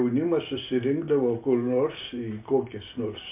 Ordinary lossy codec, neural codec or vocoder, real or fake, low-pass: MP3, 32 kbps; none; real; 3.6 kHz